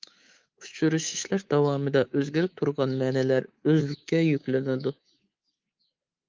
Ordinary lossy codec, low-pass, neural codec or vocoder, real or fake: Opus, 32 kbps; 7.2 kHz; codec, 24 kHz, 3.1 kbps, DualCodec; fake